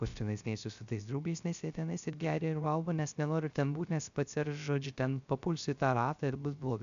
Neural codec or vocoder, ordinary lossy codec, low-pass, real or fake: codec, 16 kHz, 0.3 kbps, FocalCodec; AAC, 96 kbps; 7.2 kHz; fake